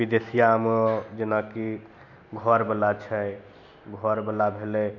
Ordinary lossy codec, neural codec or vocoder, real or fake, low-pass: none; none; real; 7.2 kHz